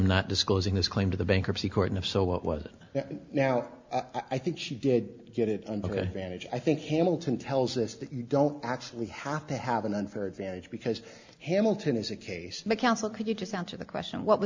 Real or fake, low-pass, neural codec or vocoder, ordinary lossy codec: real; 7.2 kHz; none; MP3, 64 kbps